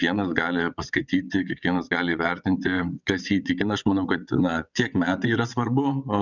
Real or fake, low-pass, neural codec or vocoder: fake; 7.2 kHz; vocoder, 22.05 kHz, 80 mel bands, WaveNeXt